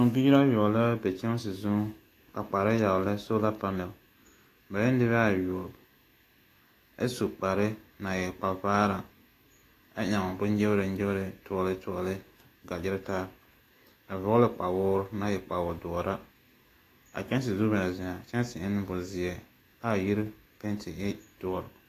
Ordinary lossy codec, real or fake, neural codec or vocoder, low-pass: AAC, 48 kbps; fake; autoencoder, 48 kHz, 128 numbers a frame, DAC-VAE, trained on Japanese speech; 14.4 kHz